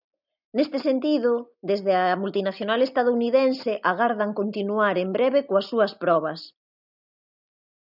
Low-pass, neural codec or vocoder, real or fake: 5.4 kHz; none; real